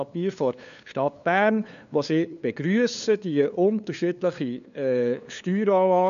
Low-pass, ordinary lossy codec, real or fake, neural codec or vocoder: 7.2 kHz; none; fake; codec, 16 kHz, 2 kbps, FunCodec, trained on LibriTTS, 25 frames a second